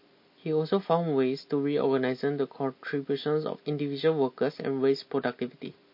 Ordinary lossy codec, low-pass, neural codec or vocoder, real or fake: MP3, 32 kbps; 5.4 kHz; none; real